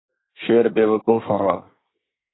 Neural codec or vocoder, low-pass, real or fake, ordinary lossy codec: codec, 24 kHz, 1 kbps, SNAC; 7.2 kHz; fake; AAC, 16 kbps